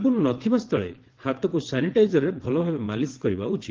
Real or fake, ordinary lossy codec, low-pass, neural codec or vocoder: fake; Opus, 16 kbps; 7.2 kHz; vocoder, 22.05 kHz, 80 mel bands, WaveNeXt